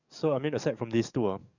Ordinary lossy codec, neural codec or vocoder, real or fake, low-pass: none; codec, 44.1 kHz, 7.8 kbps, DAC; fake; 7.2 kHz